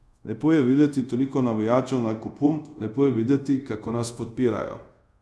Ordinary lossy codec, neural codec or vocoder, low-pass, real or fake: none; codec, 24 kHz, 0.5 kbps, DualCodec; none; fake